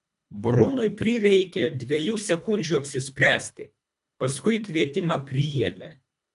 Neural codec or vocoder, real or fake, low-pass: codec, 24 kHz, 1.5 kbps, HILCodec; fake; 10.8 kHz